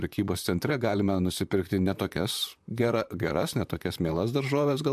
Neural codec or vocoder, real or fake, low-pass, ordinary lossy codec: vocoder, 44.1 kHz, 128 mel bands, Pupu-Vocoder; fake; 14.4 kHz; AAC, 96 kbps